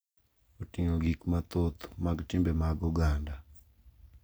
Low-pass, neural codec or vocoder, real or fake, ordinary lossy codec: none; none; real; none